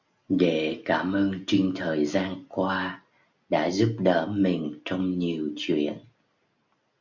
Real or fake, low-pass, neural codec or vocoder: real; 7.2 kHz; none